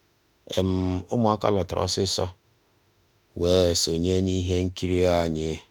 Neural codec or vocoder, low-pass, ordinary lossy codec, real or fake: autoencoder, 48 kHz, 32 numbers a frame, DAC-VAE, trained on Japanese speech; 19.8 kHz; none; fake